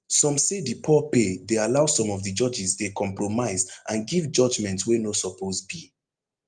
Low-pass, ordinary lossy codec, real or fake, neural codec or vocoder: 9.9 kHz; Opus, 32 kbps; real; none